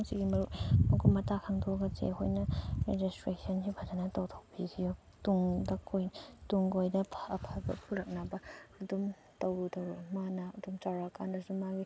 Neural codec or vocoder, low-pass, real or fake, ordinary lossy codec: none; none; real; none